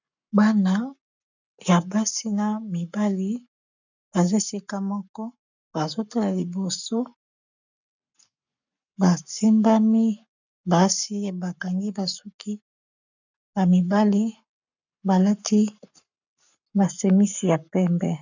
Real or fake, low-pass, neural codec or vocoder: fake; 7.2 kHz; codec, 44.1 kHz, 7.8 kbps, Pupu-Codec